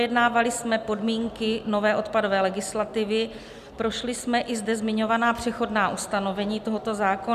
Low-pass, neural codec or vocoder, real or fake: 14.4 kHz; none; real